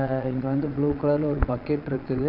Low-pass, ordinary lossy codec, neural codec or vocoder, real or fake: 5.4 kHz; Opus, 64 kbps; vocoder, 22.05 kHz, 80 mel bands, Vocos; fake